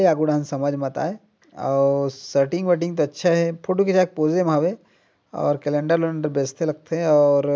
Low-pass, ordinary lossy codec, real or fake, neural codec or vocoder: none; none; real; none